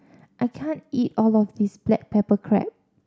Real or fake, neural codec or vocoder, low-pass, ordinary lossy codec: real; none; none; none